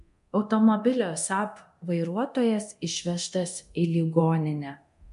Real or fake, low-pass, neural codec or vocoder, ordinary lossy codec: fake; 10.8 kHz; codec, 24 kHz, 0.9 kbps, DualCodec; MP3, 64 kbps